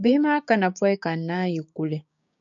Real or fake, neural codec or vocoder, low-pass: fake; codec, 16 kHz, 6 kbps, DAC; 7.2 kHz